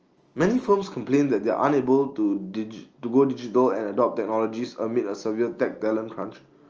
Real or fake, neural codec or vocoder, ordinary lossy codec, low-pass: real; none; Opus, 24 kbps; 7.2 kHz